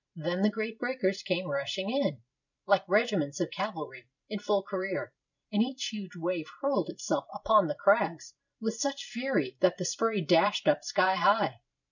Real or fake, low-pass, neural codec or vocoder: fake; 7.2 kHz; vocoder, 44.1 kHz, 128 mel bands every 512 samples, BigVGAN v2